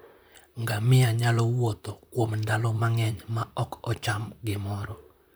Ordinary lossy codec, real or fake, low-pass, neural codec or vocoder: none; fake; none; vocoder, 44.1 kHz, 128 mel bands, Pupu-Vocoder